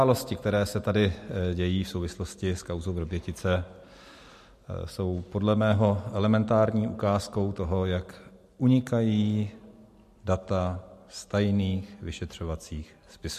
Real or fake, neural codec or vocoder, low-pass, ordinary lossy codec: real; none; 14.4 kHz; MP3, 64 kbps